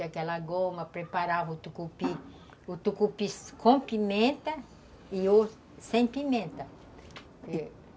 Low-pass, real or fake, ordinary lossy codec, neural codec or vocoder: none; real; none; none